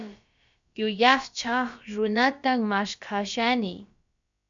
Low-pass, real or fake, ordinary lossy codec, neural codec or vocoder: 7.2 kHz; fake; MP3, 64 kbps; codec, 16 kHz, about 1 kbps, DyCAST, with the encoder's durations